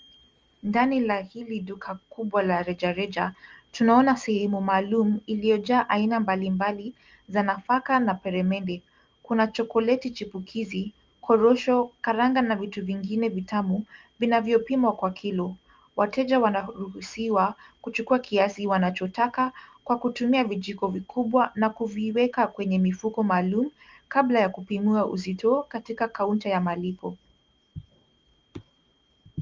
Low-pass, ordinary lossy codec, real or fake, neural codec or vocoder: 7.2 kHz; Opus, 24 kbps; real; none